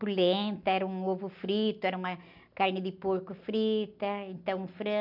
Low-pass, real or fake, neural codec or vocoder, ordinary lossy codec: 5.4 kHz; real; none; none